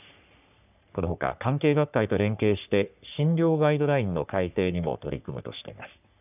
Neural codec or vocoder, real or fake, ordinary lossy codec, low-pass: codec, 44.1 kHz, 3.4 kbps, Pupu-Codec; fake; none; 3.6 kHz